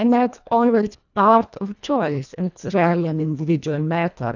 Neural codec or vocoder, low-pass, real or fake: codec, 24 kHz, 1.5 kbps, HILCodec; 7.2 kHz; fake